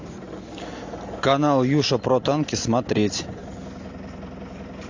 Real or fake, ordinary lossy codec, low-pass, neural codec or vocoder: real; AAC, 48 kbps; 7.2 kHz; none